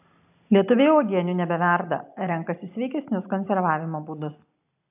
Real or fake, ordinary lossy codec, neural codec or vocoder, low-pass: real; AAC, 32 kbps; none; 3.6 kHz